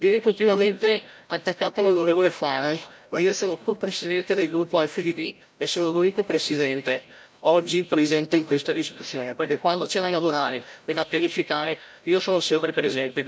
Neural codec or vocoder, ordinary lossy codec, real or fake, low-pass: codec, 16 kHz, 0.5 kbps, FreqCodec, larger model; none; fake; none